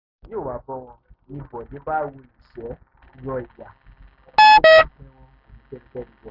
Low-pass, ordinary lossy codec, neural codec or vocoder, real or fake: 5.4 kHz; none; none; real